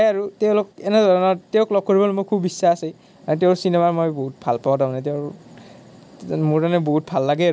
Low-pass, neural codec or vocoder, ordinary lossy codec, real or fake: none; none; none; real